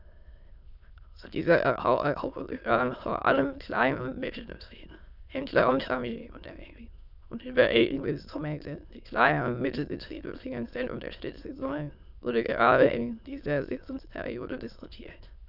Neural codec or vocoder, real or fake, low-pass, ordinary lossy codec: autoencoder, 22.05 kHz, a latent of 192 numbers a frame, VITS, trained on many speakers; fake; 5.4 kHz; none